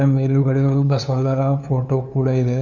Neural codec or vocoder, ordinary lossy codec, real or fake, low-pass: codec, 16 kHz, 2 kbps, FunCodec, trained on LibriTTS, 25 frames a second; none; fake; 7.2 kHz